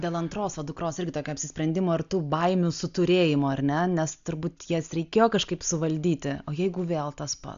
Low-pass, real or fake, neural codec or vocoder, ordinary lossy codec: 7.2 kHz; real; none; AAC, 96 kbps